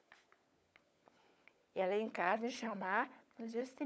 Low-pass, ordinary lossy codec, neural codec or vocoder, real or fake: none; none; codec, 16 kHz, 16 kbps, FunCodec, trained on LibriTTS, 50 frames a second; fake